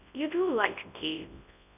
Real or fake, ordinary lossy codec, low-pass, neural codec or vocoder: fake; none; 3.6 kHz; codec, 24 kHz, 0.9 kbps, WavTokenizer, large speech release